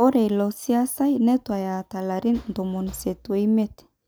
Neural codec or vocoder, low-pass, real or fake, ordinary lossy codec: none; none; real; none